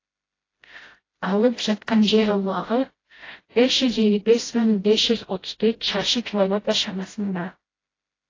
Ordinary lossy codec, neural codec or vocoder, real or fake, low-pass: AAC, 32 kbps; codec, 16 kHz, 0.5 kbps, FreqCodec, smaller model; fake; 7.2 kHz